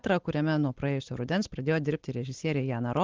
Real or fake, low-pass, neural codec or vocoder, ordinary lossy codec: real; 7.2 kHz; none; Opus, 16 kbps